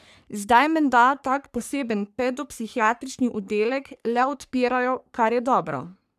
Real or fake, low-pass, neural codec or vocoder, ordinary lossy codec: fake; 14.4 kHz; codec, 44.1 kHz, 3.4 kbps, Pupu-Codec; none